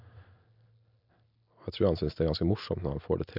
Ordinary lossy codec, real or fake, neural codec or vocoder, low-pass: MP3, 48 kbps; real; none; 5.4 kHz